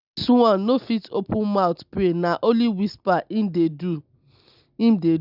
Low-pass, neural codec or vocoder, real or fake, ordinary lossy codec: 5.4 kHz; none; real; none